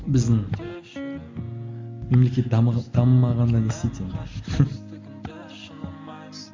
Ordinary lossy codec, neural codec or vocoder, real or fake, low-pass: MP3, 64 kbps; none; real; 7.2 kHz